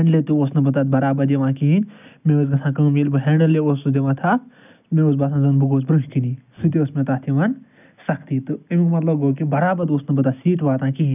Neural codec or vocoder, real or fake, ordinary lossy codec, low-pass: none; real; none; 3.6 kHz